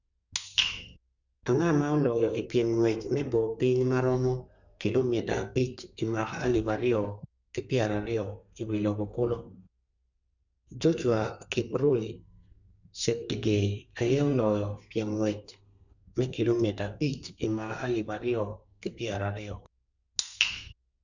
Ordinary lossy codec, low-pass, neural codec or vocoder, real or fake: none; 7.2 kHz; codec, 32 kHz, 1.9 kbps, SNAC; fake